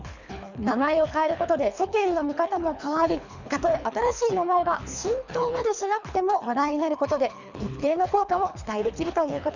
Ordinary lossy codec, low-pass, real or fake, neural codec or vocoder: none; 7.2 kHz; fake; codec, 24 kHz, 3 kbps, HILCodec